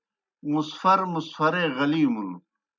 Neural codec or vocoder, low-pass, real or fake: none; 7.2 kHz; real